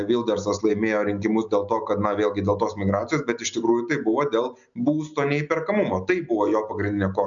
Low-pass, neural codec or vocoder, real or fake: 7.2 kHz; none; real